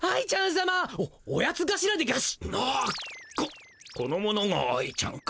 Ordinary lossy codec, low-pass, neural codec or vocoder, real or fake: none; none; none; real